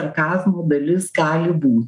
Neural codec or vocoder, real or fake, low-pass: none; real; 10.8 kHz